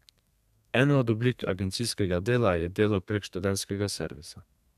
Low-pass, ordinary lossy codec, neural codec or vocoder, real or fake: 14.4 kHz; none; codec, 32 kHz, 1.9 kbps, SNAC; fake